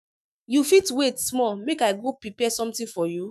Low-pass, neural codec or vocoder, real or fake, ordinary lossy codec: 14.4 kHz; autoencoder, 48 kHz, 128 numbers a frame, DAC-VAE, trained on Japanese speech; fake; none